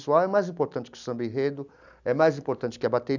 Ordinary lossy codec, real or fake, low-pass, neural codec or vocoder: none; real; 7.2 kHz; none